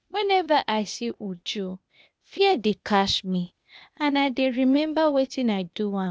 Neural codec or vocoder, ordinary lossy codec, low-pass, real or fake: codec, 16 kHz, 0.8 kbps, ZipCodec; none; none; fake